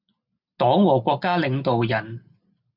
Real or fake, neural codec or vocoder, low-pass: real; none; 5.4 kHz